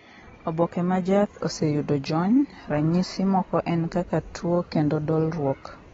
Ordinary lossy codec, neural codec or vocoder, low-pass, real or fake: AAC, 24 kbps; none; 7.2 kHz; real